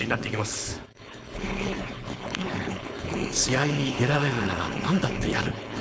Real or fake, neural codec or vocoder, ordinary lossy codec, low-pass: fake; codec, 16 kHz, 4.8 kbps, FACodec; none; none